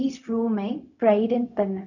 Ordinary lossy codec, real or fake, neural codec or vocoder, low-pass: none; fake; codec, 16 kHz, 0.4 kbps, LongCat-Audio-Codec; 7.2 kHz